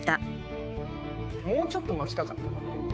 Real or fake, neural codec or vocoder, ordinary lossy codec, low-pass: fake; codec, 16 kHz, 4 kbps, X-Codec, HuBERT features, trained on balanced general audio; none; none